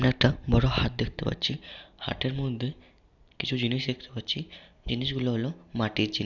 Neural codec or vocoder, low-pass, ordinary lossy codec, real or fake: none; 7.2 kHz; none; real